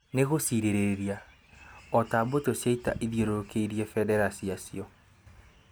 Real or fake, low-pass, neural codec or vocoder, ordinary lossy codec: real; none; none; none